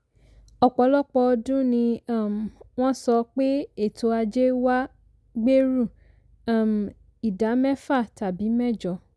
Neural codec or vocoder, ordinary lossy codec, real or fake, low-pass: none; none; real; none